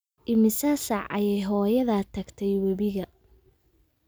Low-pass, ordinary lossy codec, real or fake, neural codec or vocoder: none; none; real; none